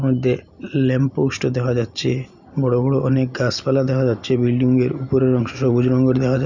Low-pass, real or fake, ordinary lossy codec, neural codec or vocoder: 7.2 kHz; real; none; none